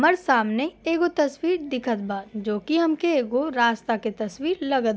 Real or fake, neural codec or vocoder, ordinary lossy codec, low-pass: real; none; none; none